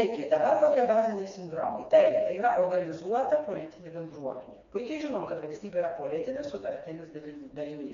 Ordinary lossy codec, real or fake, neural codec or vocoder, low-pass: MP3, 64 kbps; fake; codec, 16 kHz, 2 kbps, FreqCodec, smaller model; 7.2 kHz